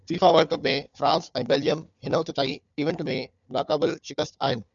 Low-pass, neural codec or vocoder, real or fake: 7.2 kHz; codec, 16 kHz, 4 kbps, FunCodec, trained on Chinese and English, 50 frames a second; fake